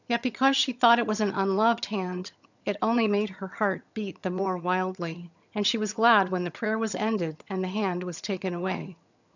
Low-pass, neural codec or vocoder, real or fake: 7.2 kHz; vocoder, 22.05 kHz, 80 mel bands, HiFi-GAN; fake